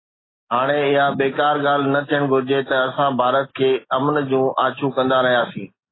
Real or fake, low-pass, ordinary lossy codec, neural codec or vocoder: real; 7.2 kHz; AAC, 16 kbps; none